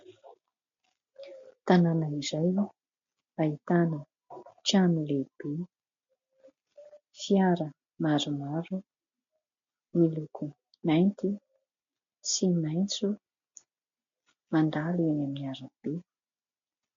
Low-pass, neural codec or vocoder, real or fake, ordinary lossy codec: 7.2 kHz; none; real; MP3, 48 kbps